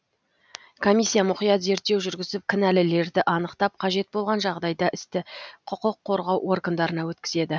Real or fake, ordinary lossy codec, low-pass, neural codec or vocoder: real; none; none; none